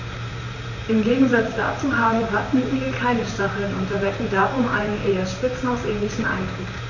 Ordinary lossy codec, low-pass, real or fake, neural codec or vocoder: none; 7.2 kHz; fake; vocoder, 44.1 kHz, 128 mel bands, Pupu-Vocoder